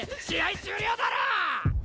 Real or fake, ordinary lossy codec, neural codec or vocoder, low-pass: real; none; none; none